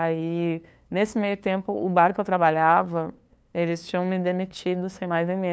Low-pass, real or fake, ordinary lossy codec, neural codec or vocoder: none; fake; none; codec, 16 kHz, 2 kbps, FunCodec, trained on LibriTTS, 25 frames a second